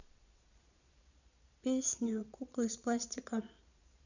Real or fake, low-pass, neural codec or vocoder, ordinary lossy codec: fake; 7.2 kHz; vocoder, 22.05 kHz, 80 mel bands, Vocos; none